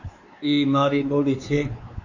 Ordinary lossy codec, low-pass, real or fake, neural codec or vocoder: AAC, 48 kbps; 7.2 kHz; fake; codec, 16 kHz, 4 kbps, X-Codec, WavLM features, trained on Multilingual LibriSpeech